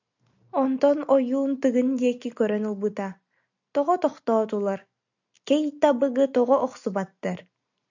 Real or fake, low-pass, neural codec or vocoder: real; 7.2 kHz; none